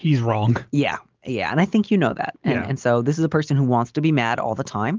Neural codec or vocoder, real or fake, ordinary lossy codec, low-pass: none; real; Opus, 24 kbps; 7.2 kHz